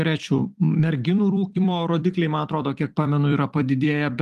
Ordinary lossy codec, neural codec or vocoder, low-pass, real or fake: Opus, 24 kbps; vocoder, 44.1 kHz, 128 mel bands every 256 samples, BigVGAN v2; 14.4 kHz; fake